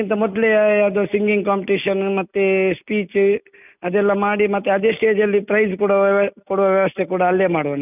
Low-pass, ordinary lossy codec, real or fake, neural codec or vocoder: 3.6 kHz; none; real; none